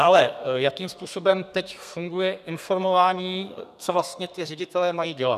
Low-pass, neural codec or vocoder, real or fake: 14.4 kHz; codec, 32 kHz, 1.9 kbps, SNAC; fake